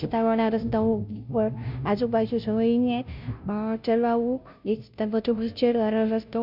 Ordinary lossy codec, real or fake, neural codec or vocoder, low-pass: none; fake; codec, 16 kHz, 0.5 kbps, FunCodec, trained on Chinese and English, 25 frames a second; 5.4 kHz